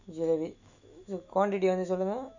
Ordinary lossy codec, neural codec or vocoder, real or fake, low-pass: none; autoencoder, 48 kHz, 128 numbers a frame, DAC-VAE, trained on Japanese speech; fake; 7.2 kHz